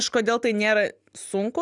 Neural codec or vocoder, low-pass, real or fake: none; 10.8 kHz; real